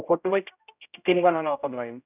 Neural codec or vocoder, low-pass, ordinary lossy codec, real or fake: codec, 16 kHz, 0.5 kbps, X-Codec, HuBERT features, trained on general audio; 3.6 kHz; none; fake